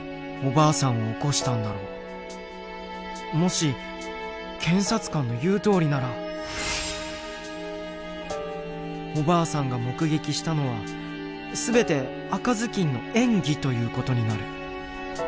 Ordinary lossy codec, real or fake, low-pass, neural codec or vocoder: none; real; none; none